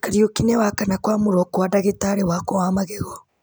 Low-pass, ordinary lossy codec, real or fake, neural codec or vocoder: none; none; real; none